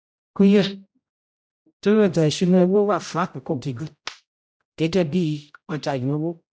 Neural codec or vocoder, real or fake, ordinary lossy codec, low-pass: codec, 16 kHz, 0.5 kbps, X-Codec, HuBERT features, trained on general audio; fake; none; none